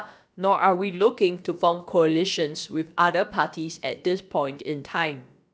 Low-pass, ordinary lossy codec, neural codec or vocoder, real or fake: none; none; codec, 16 kHz, about 1 kbps, DyCAST, with the encoder's durations; fake